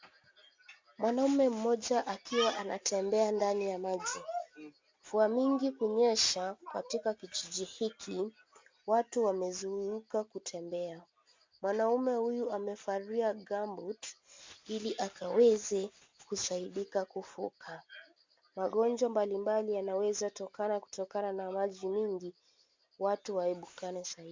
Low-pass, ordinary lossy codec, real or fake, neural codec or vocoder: 7.2 kHz; MP3, 64 kbps; real; none